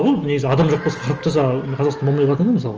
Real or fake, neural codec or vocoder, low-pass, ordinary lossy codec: real; none; 7.2 kHz; Opus, 24 kbps